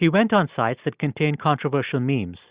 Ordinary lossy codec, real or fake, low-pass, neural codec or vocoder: Opus, 64 kbps; real; 3.6 kHz; none